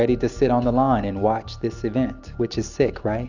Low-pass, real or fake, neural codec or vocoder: 7.2 kHz; real; none